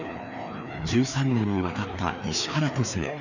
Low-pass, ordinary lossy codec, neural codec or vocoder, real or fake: 7.2 kHz; none; codec, 16 kHz, 2 kbps, FreqCodec, larger model; fake